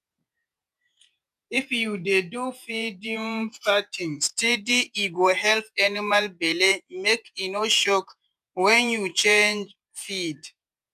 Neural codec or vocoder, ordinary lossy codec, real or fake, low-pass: vocoder, 48 kHz, 128 mel bands, Vocos; none; fake; 14.4 kHz